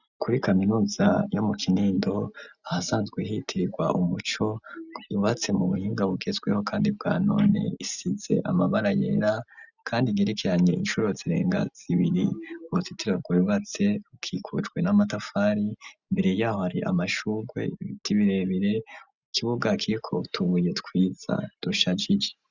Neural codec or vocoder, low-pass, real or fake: none; 7.2 kHz; real